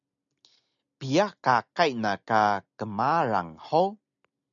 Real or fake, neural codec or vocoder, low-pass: real; none; 7.2 kHz